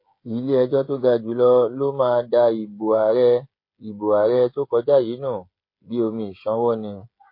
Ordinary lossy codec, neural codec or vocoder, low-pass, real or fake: MP3, 32 kbps; codec, 16 kHz, 16 kbps, FreqCodec, smaller model; 5.4 kHz; fake